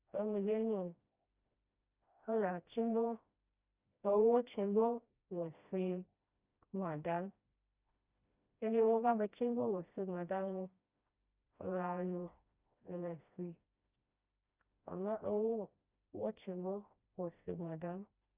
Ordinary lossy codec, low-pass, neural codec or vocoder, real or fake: Opus, 64 kbps; 3.6 kHz; codec, 16 kHz, 1 kbps, FreqCodec, smaller model; fake